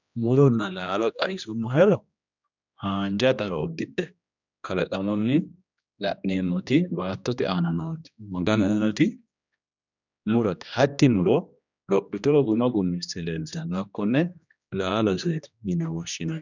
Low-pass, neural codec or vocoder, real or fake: 7.2 kHz; codec, 16 kHz, 1 kbps, X-Codec, HuBERT features, trained on general audio; fake